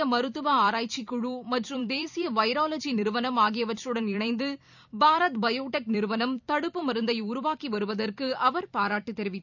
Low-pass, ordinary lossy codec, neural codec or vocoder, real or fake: 7.2 kHz; none; vocoder, 44.1 kHz, 128 mel bands every 256 samples, BigVGAN v2; fake